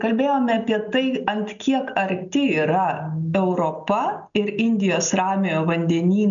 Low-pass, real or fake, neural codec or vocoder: 7.2 kHz; real; none